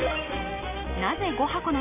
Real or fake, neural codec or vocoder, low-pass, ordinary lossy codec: real; none; 3.6 kHz; none